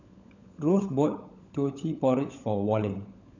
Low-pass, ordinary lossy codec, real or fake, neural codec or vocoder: 7.2 kHz; none; fake; codec, 16 kHz, 16 kbps, FunCodec, trained on LibriTTS, 50 frames a second